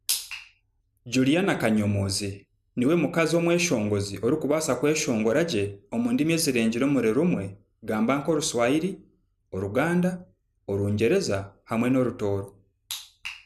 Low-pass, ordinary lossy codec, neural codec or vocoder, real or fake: 14.4 kHz; none; none; real